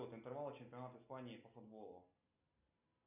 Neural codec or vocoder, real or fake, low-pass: none; real; 3.6 kHz